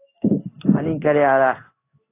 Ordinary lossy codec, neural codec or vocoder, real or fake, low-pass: AAC, 24 kbps; codec, 16 kHz in and 24 kHz out, 1 kbps, XY-Tokenizer; fake; 3.6 kHz